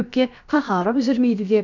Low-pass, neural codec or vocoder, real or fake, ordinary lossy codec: 7.2 kHz; codec, 16 kHz, about 1 kbps, DyCAST, with the encoder's durations; fake; none